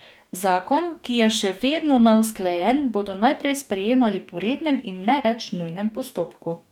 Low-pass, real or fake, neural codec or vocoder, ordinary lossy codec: 19.8 kHz; fake; codec, 44.1 kHz, 2.6 kbps, DAC; none